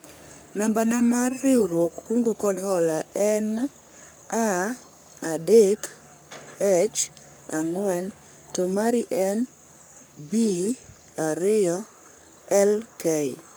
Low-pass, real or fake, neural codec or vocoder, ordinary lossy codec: none; fake; codec, 44.1 kHz, 3.4 kbps, Pupu-Codec; none